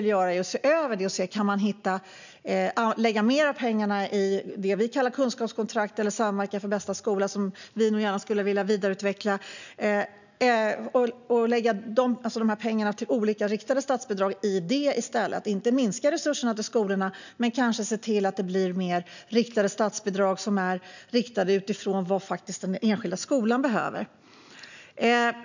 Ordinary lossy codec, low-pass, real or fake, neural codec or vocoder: none; 7.2 kHz; real; none